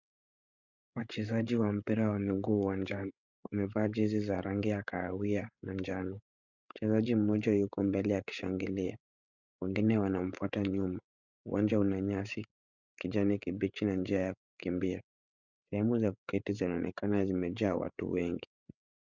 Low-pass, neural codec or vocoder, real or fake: 7.2 kHz; codec, 16 kHz, 8 kbps, FreqCodec, larger model; fake